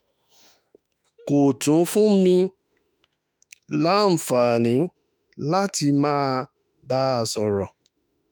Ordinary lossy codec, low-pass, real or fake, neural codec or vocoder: none; none; fake; autoencoder, 48 kHz, 32 numbers a frame, DAC-VAE, trained on Japanese speech